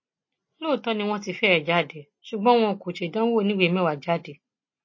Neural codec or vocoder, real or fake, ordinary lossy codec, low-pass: none; real; MP3, 32 kbps; 7.2 kHz